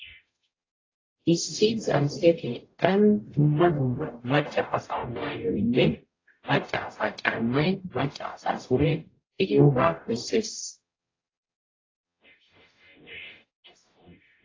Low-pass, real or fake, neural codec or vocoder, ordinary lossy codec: 7.2 kHz; fake; codec, 44.1 kHz, 0.9 kbps, DAC; AAC, 32 kbps